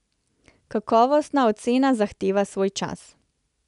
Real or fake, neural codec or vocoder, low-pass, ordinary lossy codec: real; none; 10.8 kHz; none